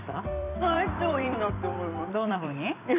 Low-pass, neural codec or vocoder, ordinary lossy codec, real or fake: 3.6 kHz; vocoder, 44.1 kHz, 128 mel bands every 256 samples, BigVGAN v2; none; fake